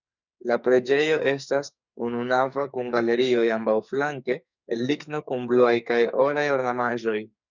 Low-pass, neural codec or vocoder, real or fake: 7.2 kHz; codec, 44.1 kHz, 2.6 kbps, SNAC; fake